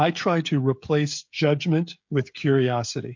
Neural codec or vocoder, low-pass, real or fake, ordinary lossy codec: codec, 16 kHz, 16 kbps, FreqCodec, smaller model; 7.2 kHz; fake; MP3, 48 kbps